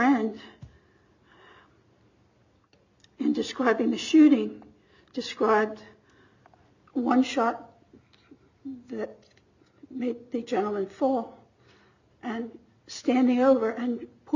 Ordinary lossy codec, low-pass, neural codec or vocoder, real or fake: MP3, 64 kbps; 7.2 kHz; none; real